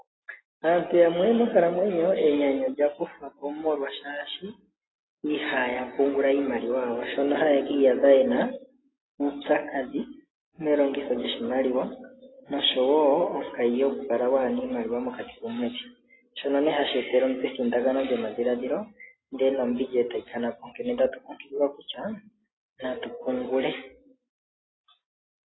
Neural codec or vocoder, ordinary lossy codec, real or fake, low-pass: none; AAC, 16 kbps; real; 7.2 kHz